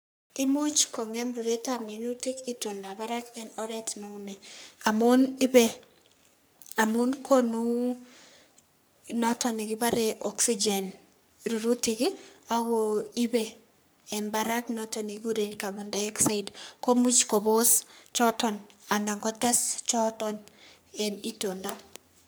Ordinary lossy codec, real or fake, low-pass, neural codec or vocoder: none; fake; none; codec, 44.1 kHz, 3.4 kbps, Pupu-Codec